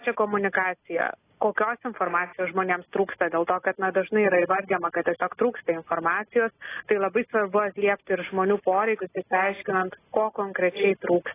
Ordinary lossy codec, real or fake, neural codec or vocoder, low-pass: AAC, 16 kbps; real; none; 3.6 kHz